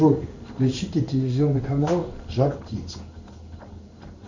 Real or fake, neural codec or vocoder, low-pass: fake; codec, 16 kHz in and 24 kHz out, 1 kbps, XY-Tokenizer; 7.2 kHz